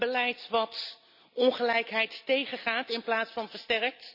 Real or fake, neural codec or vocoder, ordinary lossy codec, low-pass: real; none; none; 5.4 kHz